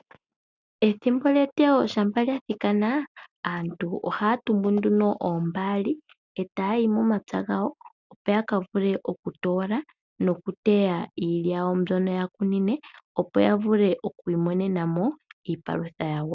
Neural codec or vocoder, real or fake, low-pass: none; real; 7.2 kHz